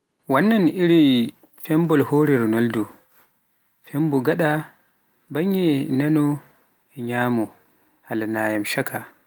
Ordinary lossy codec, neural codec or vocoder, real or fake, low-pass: Opus, 24 kbps; none; real; 19.8 kHz